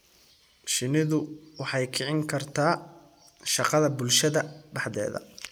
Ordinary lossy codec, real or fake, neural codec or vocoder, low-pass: none; real; none; none